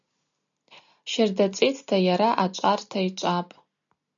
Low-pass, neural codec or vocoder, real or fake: 7.2 kHz; none; real